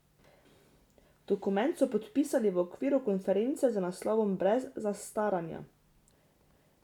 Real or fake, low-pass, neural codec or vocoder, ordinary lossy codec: real; 19.8 kHz; none; none